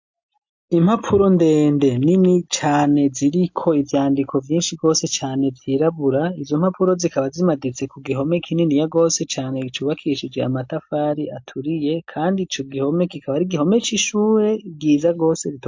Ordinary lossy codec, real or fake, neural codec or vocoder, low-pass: MP3, 48 kbps; real; none; 7.2 kHz